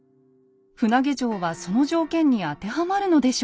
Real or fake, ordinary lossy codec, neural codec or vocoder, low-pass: real; none; none; none